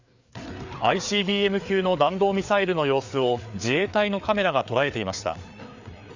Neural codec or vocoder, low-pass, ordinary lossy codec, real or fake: codec, 16 kHz, 4 kbps, FreqCodec, larger model; 7.2 kHz; Opus, 64 kbps; fake